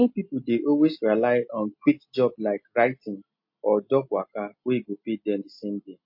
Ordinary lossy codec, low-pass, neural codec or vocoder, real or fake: MP3, 32 kbps; 5.4 kHz; none; real